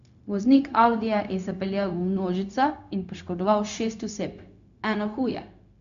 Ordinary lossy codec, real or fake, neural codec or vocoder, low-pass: none; fake; codec, 16 kHz, 0.4 kbps, LongCat-Audio-Codec; 7.2 kHz